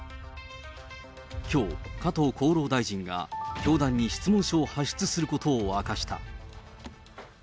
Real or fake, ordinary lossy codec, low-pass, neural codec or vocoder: real; none; none; none